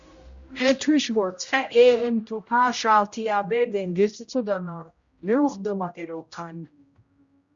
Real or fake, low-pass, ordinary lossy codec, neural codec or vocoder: fake; 7.2 kHz; Opus, 64 kbps; codec, 16 kHz, 0.5 kbps, X-Codec, HuBERT features, trained on general audio